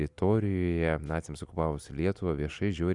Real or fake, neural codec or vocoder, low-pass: real; none; 10.8 kHz